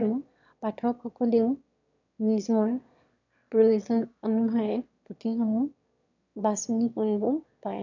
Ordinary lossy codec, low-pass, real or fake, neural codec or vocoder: none; 7.2 kHz; fake; autoencoder, 22.05 kHz, a latent of 192 numbers a frame, VITS, trained on one speaker